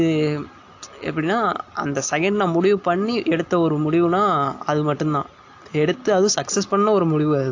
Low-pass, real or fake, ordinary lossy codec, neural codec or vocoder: 7.2 kHz; real; AAC, 48 kbps; none